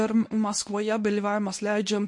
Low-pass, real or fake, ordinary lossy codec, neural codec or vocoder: 10.8 kHz; fake; MP3, 48 kbps; codec, 24 kHz, 0.9 kbps, WavTokenizer, medium speech release version 1